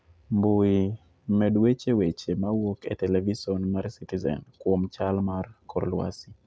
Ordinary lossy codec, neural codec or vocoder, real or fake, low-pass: none; none; real; none